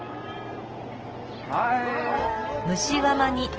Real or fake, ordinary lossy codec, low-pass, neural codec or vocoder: real; Opus, 16 kbps; 7.2 kHz; none